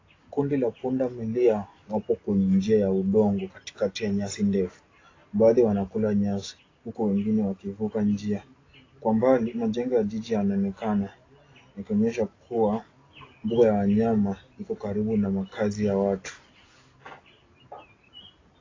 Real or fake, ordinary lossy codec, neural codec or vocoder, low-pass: real; AAC, 32 kbps; none; 7.2 kHz